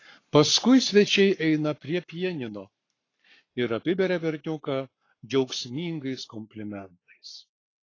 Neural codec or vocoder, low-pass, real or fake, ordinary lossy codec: codec, 44.1 kHz, 7.8 kbps, Pupu-Codec; 7.2 kHz; fake; AAC, 32 kbps